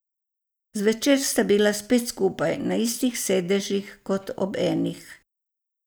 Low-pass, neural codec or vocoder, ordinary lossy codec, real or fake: none; none; none; real